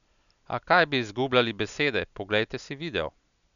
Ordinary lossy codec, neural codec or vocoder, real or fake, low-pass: none; none; real; 7.2 kHz